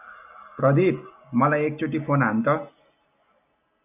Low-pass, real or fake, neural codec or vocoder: 3.6 kHz; real; none